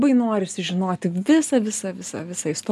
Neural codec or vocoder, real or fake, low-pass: none; real; 14.4 kHz